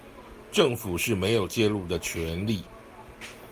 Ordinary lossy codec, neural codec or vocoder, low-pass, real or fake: Opus, 32 kbps; none; 14.4 kHz; real